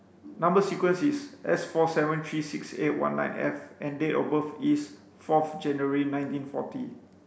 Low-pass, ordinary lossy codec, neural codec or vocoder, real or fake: none; none; none; real